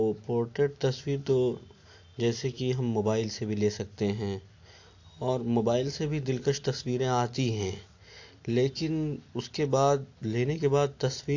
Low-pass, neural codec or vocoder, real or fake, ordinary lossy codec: 7.2 kHz; none; real; AAC, 48 kbps